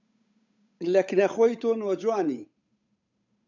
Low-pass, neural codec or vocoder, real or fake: 7.2 kHz; codec, 16 kHz, 8 kbps, FunCodec, trained on Chinese and English, 25 frames a second; fake